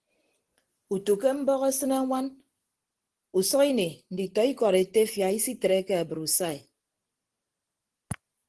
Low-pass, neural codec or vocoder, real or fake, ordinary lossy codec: 10.8 kHz; none; real; Opus, 16 kbps